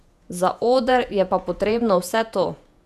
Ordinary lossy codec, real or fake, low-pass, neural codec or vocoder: none; fake; 14.4 kHz; vocoder, 48 kHz, 128 mel bands, Vocos